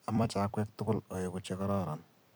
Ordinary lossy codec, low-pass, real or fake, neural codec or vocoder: none; none; fake; vocoder, 44.1 kHz, 128 mel bands every 256 samples, BigVGAN v2